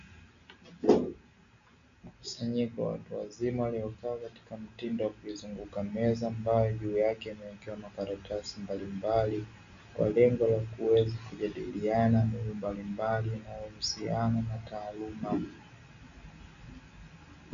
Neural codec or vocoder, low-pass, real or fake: none; 7.2 kHz; real